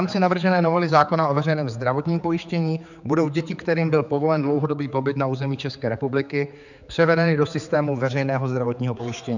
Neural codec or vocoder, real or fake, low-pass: codec, 16 kHz, 4 kbps, X-Codec, HuBERT features, trained on general audio; fake; 7.2 kHz